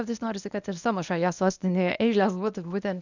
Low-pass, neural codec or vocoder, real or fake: 7.2 kHz; codec, 24 kHz, 0.9 kbps, WavTokenizer, medium speech release version 1; fake